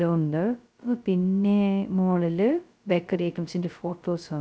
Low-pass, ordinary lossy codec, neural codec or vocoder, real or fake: none; none; codec, 16 kHz, 0.2 kbps, FocalCodec; fake